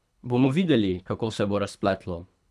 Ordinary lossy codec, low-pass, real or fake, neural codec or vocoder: none; none; fake; codec, 24 kHz, 3 kbps, HILCodec